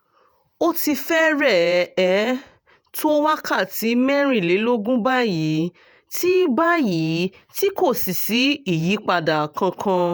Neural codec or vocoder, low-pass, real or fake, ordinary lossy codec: vocoder, 48 kHz, 128 mel bands, Vocos; none; fake; none